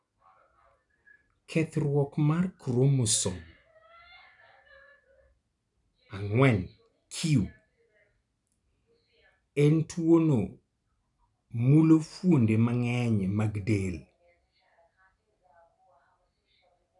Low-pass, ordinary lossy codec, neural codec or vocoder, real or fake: 10.8 kHz; none; none; real